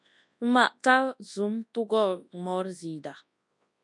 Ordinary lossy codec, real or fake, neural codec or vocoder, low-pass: MP3, 64 kbps; fake; codec, 24 kHz, 0.9 kbps, WavTokenizer, large speech release; 10.8 kHz